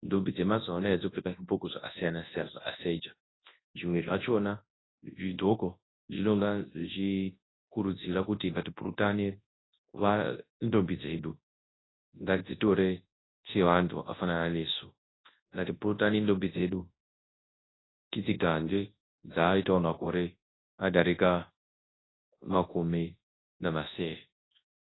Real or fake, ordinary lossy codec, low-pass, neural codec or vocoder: fake; AAC, 16 kbps; 7.2 kHz; codec, 24 kHz, 0.9 kbps, WavTokenizer, large speech release